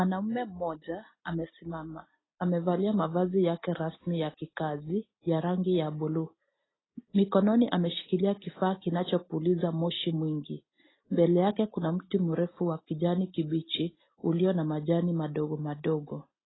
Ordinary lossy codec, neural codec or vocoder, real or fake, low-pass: AAC, 16 kbps; none; real; 7.2 kHz